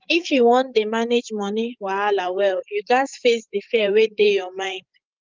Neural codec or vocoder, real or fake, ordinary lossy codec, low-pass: codec, 16 kHz, 8 kbps, FreqCodec, larger model; fake; Opus, 24 kbps; 7.2 kHz